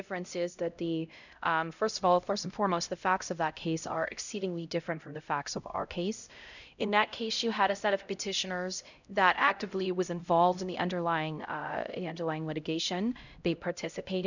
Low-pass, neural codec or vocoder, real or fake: 7.2 kHz; codec, 16 kHz, 0.5 kbps, X-Codec, HuBERT features, trained on LibriSpeech; fake